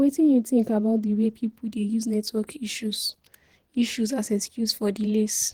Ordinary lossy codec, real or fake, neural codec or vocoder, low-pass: Opus, 16 kbps; fake; vocoder, 48 kHz, 128 mel bands, Vocos; 19.8 kHz